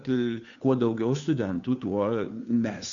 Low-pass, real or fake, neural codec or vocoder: 7.2 kHz; fake; codec, 16 kHz, 2 kbps, FunCodec, trained on Chinese and English, 25 frames a second